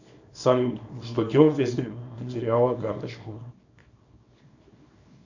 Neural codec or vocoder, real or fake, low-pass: codec, 24 kHz, 0.9 kbps, WavTokenizer, small release; fake; 7.2 kHz